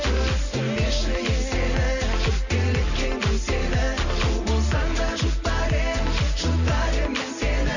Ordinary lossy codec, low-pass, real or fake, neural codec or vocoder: none; 7.2 kHz; real; none